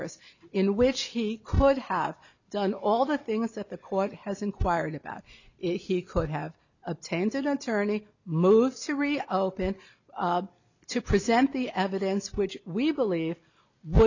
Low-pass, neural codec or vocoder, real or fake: 7.2 kHz; none; real